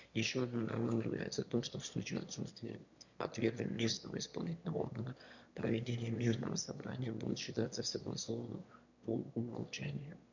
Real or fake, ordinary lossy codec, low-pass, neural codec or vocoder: fake; none; 7.2 kHz; autoencoder, 22.05 kHz, a latent of 192 numbers a frame, VITS, trained on one speaker